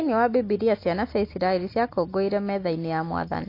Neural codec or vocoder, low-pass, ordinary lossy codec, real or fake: none; 5.4 kHz; AAC, 32 kbps; real